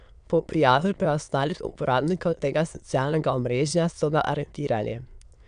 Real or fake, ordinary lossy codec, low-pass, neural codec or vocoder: fake; none; 9.9 kHz; autoencoder, 22.05 kHz, a latent of 192 numbers a frame, VITS, trained on many speakers